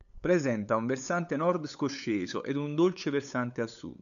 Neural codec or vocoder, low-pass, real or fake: codec, 16 kHz, 8 kbps, FunCodec, trained on LibriTTS, 25 frames a second; 7.2 kHz; fake